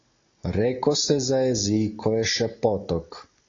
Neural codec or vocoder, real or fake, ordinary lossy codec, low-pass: none; real; AAC, 32 kbps; 7.2 kHz